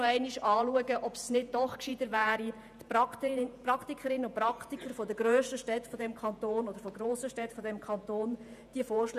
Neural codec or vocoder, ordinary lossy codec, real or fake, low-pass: vocoder, 48 kHz, 128 mel bands, Vocos; none; fake; 14.4 kHz